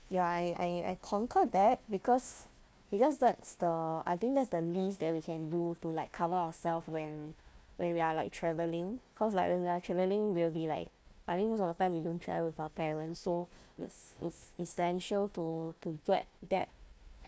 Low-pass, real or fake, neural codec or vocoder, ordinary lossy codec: none; fake; codec, 16 kHz, 1 kbps, FunCodec, trained on Chinese and English, 50 frames a second; none